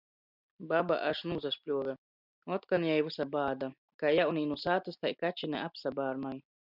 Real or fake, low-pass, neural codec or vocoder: real; 5.4 kHz; none